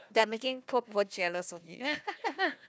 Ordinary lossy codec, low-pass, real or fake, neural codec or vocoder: none; none; fake; codec, 16 kHz, 1 kbps, FunCodec, trained on Chinese and English, 50 frames a second